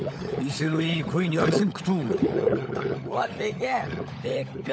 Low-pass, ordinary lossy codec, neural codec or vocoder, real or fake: none; none; codec, 16 kHz, 16 kbps, FunCodec, trained on LibriTTS, 50 frames a second; fake